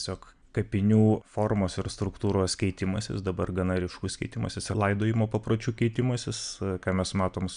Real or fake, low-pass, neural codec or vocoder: real; 9.9 kHz; none